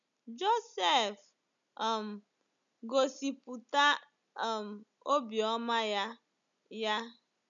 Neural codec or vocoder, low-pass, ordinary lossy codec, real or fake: none; 7.2 kHz; none; real